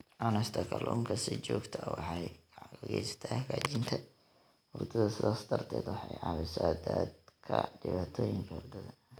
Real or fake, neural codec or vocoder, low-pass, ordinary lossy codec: real; none; none; none